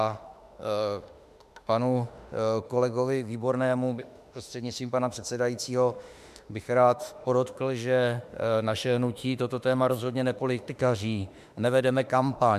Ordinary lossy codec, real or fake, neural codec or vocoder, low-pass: MP3, 96 kbps; fake; autoencoder, 48 kHz, 32 numbers a frame, DAC-VAE, trained on Japanese speech; 14.4 kHz